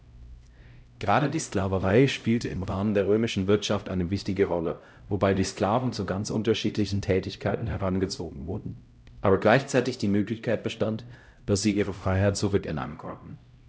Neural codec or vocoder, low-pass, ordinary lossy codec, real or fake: codec, 16 kHz, 0.5 kbps, X-Codec, HuBERT features, trained on LibriSpeech; none; none; fake